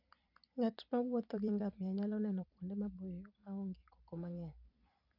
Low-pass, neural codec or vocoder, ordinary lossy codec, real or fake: 5.4 kHz; vocoder, 44.1 kHz, 128 mel bands every 256 samples, BigVGAN v2; none; fake